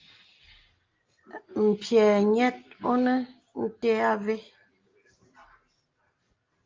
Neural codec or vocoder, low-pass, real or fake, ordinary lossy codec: none; 7.2 kHz; real; Opus, 32 kbps